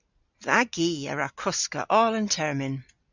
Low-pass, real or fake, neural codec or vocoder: 7.2 kHz; real; none